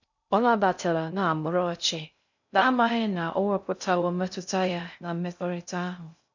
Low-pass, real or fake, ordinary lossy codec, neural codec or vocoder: 7.2 kHz; fake; none; codec, 16 kHz in and 24 kHz out, 0.6 kbps, FocalCodec, streaming, 2048 codes